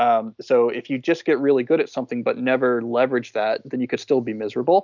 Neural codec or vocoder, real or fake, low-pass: none; real; 7.2 kHz